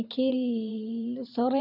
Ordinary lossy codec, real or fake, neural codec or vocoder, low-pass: none; fake; vocoder, 44.1 kHz, 128 mel bands every 512 samples, BigVGAN v2; 5.4 kHz